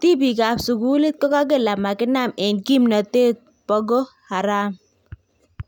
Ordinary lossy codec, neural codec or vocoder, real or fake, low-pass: none; none; real; 19.8 kHz